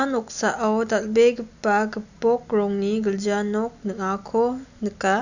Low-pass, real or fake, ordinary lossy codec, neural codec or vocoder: 7.2 kHz; real; none; none